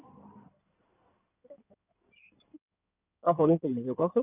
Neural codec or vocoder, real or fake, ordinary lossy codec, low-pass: codec, 16 kHz in and 24 kHz out, 2.2 kbps, FireRedTTS-2 codec; fake; none; 3.6 kHz